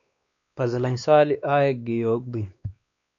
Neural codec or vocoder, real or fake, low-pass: codec, 16 kHz, 2 kbps, X-Codec, WavLM features, trained on Multilingual LibriSpeech; fake; 7.2 kHz